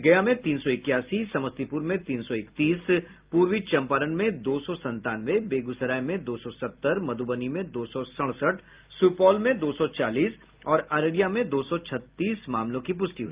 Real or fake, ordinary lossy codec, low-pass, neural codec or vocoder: real; Opus, 32 kbps; 3.6 kHz; none